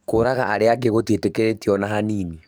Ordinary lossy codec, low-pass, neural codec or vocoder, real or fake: none; none; codec, 44.1 kHz, 7.8 kbps, DAC; fake